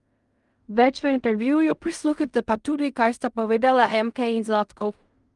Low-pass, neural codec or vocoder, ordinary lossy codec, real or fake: 10.8 kHz; codec, 16 kHz in and 24 kHz out, 0.4 kbps, LongCat-Audio-Codec, fine tuned four codebook decoder; Opus, 32 kbps; fake